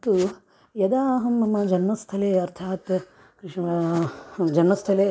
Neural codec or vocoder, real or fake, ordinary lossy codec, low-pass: none; real; none; none